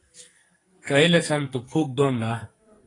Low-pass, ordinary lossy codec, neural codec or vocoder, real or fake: 10.8 kHz; AAC, 32 kbps; codec, 44.1 kHz, 2.6 kbps, SNAC; fake